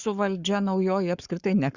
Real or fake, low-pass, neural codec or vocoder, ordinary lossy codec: fake; 7.2 kHz; codec, 44.1 kHz, 7.8 kbps, Pupu-Codec; Opus, 64 kbps